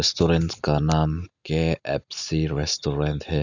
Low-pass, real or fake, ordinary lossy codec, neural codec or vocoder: 7.2 kHz; real; none; none